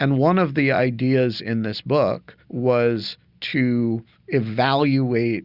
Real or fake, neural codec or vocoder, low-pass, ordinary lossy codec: real; none; 5.4 kHz; Opus, 64 kbps